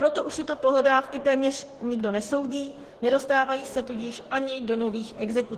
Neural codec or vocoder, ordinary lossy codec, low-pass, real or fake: codec, 44.1 kHz, 2.6 kbps, DAC; Opus, 16 kbps; 14.4 kHz; fake